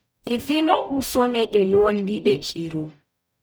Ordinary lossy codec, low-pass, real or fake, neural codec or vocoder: none; none; fake; codec, 44.1 kHz, 0.9 kbps, DAC